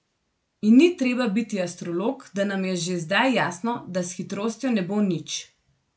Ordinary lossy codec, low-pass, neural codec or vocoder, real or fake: none; none; none; real